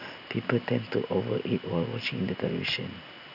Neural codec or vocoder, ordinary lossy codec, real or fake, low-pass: none; none; real; 5.4 kHz